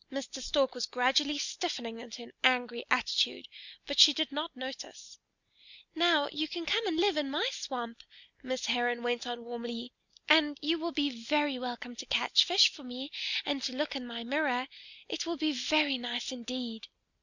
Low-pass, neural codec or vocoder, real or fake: 7.2 kHz; none; real